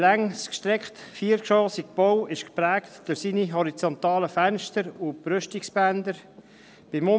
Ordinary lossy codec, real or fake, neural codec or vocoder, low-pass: none; real; none; none